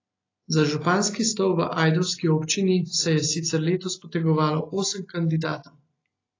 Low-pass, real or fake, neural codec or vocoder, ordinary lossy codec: 7.2 kHz; real; none; AAC, 32 kbps